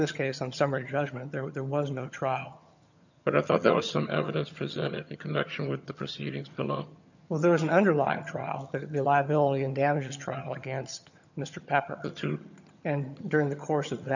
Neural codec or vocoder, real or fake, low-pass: vocoder, 22.05 kHz, 80 mel bands, HiFi-GAN; fake; 7.2 kHz